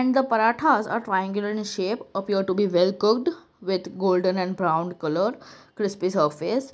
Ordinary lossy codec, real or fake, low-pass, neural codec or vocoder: none; real; none; none